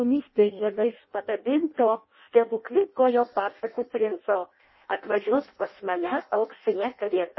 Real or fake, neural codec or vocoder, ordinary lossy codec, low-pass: fake; codec, 16 kHz in and 24 kHz out, 0.6 kbps, FireRedTTS-2 codec; MP3, 24 kbps; 7.2 kHz